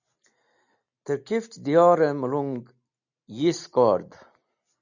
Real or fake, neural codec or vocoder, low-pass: real; none; 7.2 kHz